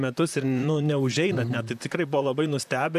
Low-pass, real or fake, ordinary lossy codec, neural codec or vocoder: 14.4 kHz; fake; AAC, 96 kbps; vocoder, 44.1 kHz, 128 mel bands, Pupu-Vocoder